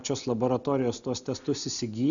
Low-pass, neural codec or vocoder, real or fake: 7.2 kHz; none; real